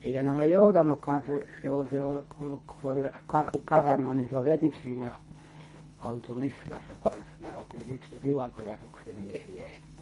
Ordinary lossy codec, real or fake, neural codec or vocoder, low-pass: MP3, 48 kbps; fake; codec, 24 kHz, 1.5 kbps, HILCodec; 10.8 kHz